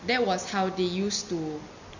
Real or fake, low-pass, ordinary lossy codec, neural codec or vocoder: real; 7.2 kHz; none; none